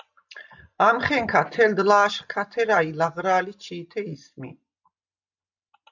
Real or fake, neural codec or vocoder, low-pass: real; none; 7.2 kHz